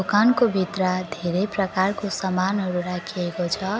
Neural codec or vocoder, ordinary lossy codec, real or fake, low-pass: none; none; real; none